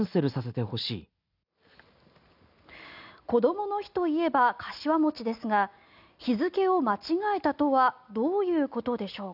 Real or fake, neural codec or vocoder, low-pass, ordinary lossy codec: real; none; 5.4 kHz; none